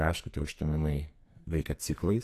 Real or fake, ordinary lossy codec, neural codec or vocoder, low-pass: fake; AAC, 64 kbps; codec, 44.1 kHz, 2.6 kbps, SNAC; 14.4 kHz